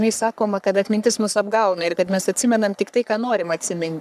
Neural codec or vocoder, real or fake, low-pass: codec, 44.1 kHz, 3.4 kbps, Pupu-Codec; fake; 14.4 kHz